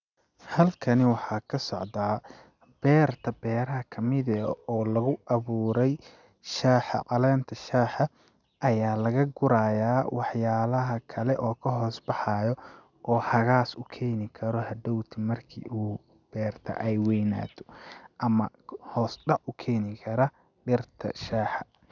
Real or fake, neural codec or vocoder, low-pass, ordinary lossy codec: real; none; 7.2 kHz; none